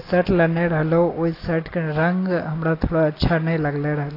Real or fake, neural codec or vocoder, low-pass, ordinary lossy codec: real; none; 5.4 kHz; AAC, 24 kbps